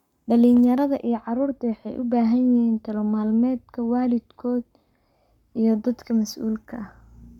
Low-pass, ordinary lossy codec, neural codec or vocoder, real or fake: 19.8 kHz; none; codec, 44.1 kHz, 7.8 kbps, Pupu-Codec; fake